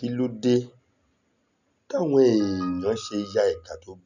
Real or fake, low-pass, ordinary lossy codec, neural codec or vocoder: real; 7.2 kHz; none; none